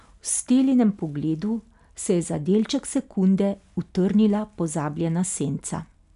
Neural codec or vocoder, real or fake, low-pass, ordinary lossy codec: none; real; 10.8 kHz; none